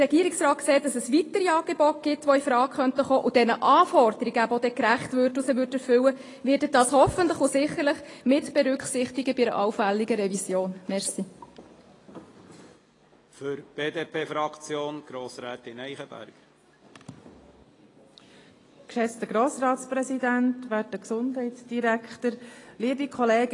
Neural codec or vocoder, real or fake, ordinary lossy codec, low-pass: none; real; AAC, 32 kbps; 10.8 kHz